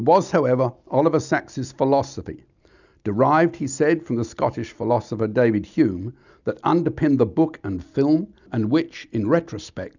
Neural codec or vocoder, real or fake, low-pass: none; real; 7.2 kHz